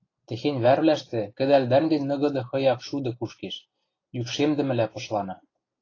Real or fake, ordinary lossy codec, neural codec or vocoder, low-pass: real; AAC, 32 kbps; none; 7.2 kHz